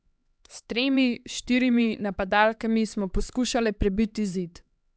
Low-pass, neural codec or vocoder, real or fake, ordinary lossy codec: none; codec, 16 kHz, 4 kbps, X-Codec, HuBERT features, trained on LibriSpeech; fake; none